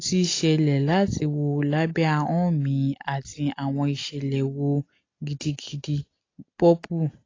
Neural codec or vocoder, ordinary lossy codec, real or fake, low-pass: none; AAC, 32 kbps; real; 7.2 kHz